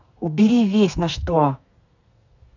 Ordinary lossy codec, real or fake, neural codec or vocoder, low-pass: none; fake; codec, 32 kHz, 1.9 kbps, SNAC; 7.2 kHz